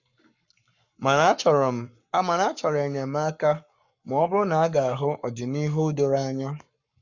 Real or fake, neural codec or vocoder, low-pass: fake; codec, 44.1 kHz, 7.8 kbps, Pupu-Codec; 7.2 kHz